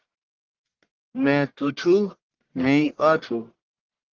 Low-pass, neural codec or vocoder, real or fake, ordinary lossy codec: 7.2 kHz; codec, 44.1 kHz, 1.7 kbps, Pupu-Codec; fake; Opus, 16 kbps